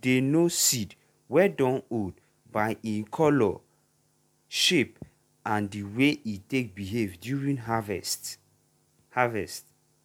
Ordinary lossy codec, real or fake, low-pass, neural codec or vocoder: MP3, 96 kbps; real; 19.8 kHz; none